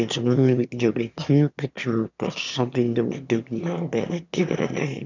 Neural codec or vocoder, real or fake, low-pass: autoencoder, 22.05 kHz, a latent of 192 numbers a frame, VITS, trained on one speaker; fake; 7.2 kHz